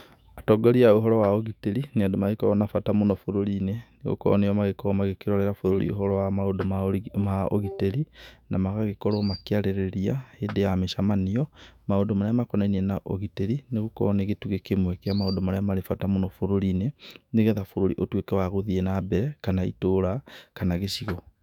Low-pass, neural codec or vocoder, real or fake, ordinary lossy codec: 19.8 kHz; autoencoder, 48 kHz, 128 numbers a frame, DAC-VAE, trained on Japanese speech; fake; none